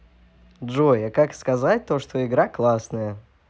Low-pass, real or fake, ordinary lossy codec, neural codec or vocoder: none; real; none; none